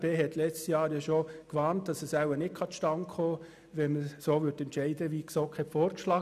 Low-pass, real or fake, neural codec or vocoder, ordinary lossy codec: 14.4 kHz; real; none; none